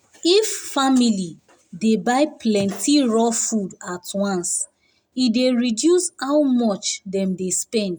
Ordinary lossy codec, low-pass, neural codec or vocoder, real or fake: none; none; none; real